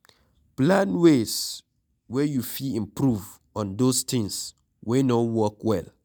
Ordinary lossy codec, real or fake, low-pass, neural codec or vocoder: none; real; none; none